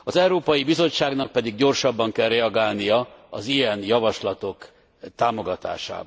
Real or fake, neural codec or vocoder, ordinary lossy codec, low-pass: real; none; none; none